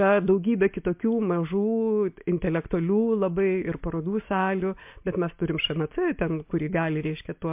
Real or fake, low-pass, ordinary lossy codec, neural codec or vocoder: real; 3.6 kHz; MP3, 32 kbps; none